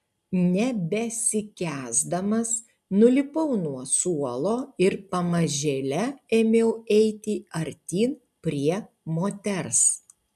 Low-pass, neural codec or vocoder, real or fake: 14.4 kHz; none; real